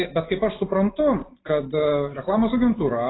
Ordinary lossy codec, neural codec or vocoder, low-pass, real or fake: AAC, 16 kbps; none; 7.2 kHz; real